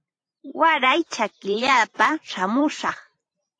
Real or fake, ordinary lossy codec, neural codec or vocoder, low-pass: fake; AAC, 48 kbps; vocoder, 44.1 kHz, 128 mel bands every 512 samples, BigVGAN v2; 9.9 kHz